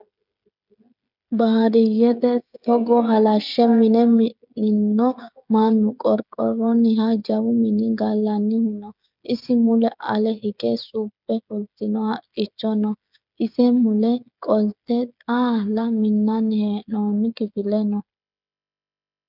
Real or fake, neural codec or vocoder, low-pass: fake; codec, 16 kHz, 16 kbps, FreqCodec, smaller model; 5.4 kHz